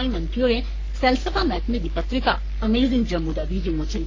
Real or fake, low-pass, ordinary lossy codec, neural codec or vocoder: fake; 7.2 kHz; AAC, 32 kbps; codec, 44.1 kHz, 3.4 kbps, Pupu-Codec